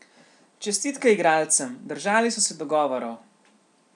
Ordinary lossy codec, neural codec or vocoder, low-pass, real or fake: none; none; 10.8 kHz; real